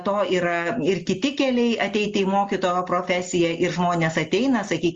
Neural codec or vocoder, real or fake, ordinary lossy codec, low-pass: none; real; Opus, 32 kbps; 7.2 kHz